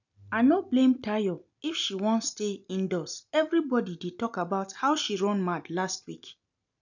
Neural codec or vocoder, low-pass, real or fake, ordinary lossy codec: none; 7.2 kHz; real; none